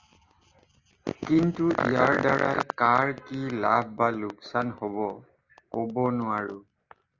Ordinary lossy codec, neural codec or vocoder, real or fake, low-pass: Opus, 32 kbps; none; real; 7.2 kHz